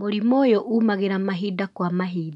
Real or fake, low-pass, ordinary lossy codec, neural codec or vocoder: real; 10.8 kHz; none; none